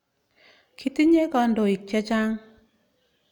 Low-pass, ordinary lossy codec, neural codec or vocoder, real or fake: 19.8 kHz; none; none; real